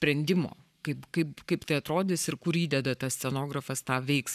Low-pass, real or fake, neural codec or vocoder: 14.4 kHz; fake; codec, 44.1 kHz, 7.8 kbps, Pupu-Codec